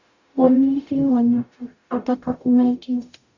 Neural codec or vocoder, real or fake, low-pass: codec, 44.1 kHz, 0.9 kbps, DAC; fake; 7.2 kHz